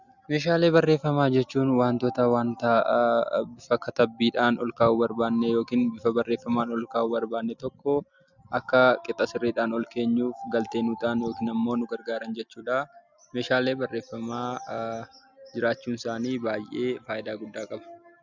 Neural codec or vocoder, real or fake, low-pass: none; real; 7.2 kHz